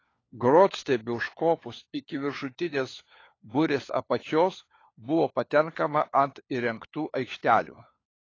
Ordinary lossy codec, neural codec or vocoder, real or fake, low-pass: AAC, 32 kbps; codec, 16 kHz, 4 kbps, FunCodec, trained on LibriTTS, 50 frames a second; fake; 7.2 kHz